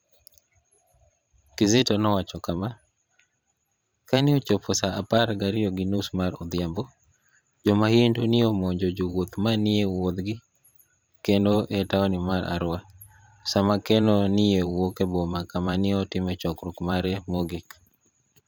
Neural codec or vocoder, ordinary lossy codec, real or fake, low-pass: vocoder, 44.1 kHz, 128 mel bands every 512 samples, BigVGAN v2; none; fake; none